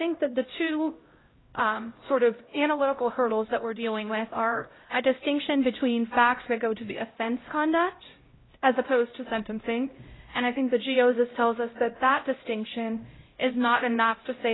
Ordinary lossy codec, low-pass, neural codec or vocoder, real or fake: AAC, 16 kbps; 7.2 kHz; codec, 16 kHz, 0.5 kbps, X-Codec, HuBERT features, trained on LibriSpeech; fake